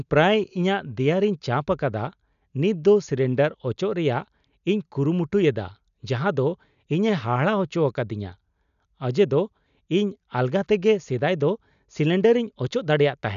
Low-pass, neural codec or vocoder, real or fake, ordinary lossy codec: 7.2 kHz; none; real; none